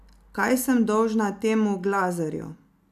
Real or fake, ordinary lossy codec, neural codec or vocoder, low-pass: real; none; none; 14.4 kHz